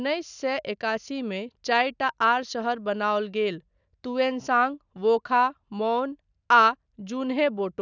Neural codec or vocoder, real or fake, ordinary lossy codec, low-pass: none; real; none; 7.2 kHz